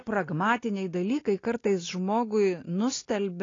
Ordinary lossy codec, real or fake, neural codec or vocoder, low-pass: AAC, 32 kbps; real; none; 7.2 kHz